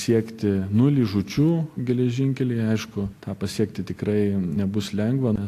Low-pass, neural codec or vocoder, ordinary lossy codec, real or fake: 14.4 kHz; none; AAC, 64 kbps; real